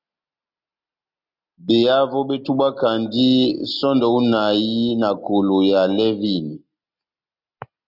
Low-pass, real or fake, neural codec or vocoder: 5.4 kHz; real; none